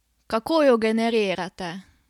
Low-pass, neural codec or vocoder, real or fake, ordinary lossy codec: 19.8 kHz; none; real; none